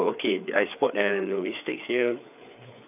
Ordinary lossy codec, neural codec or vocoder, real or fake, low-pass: none; codec, 16 kHz, 4 kbps, FreqCodec, larger model; fake; 3.6 kHz